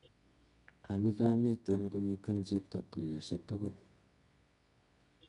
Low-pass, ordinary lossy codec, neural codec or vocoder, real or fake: 10.8 kHz; none; codec, 24 kHz, 0.9 kbps, WavTokenizer, medium music audio release; fake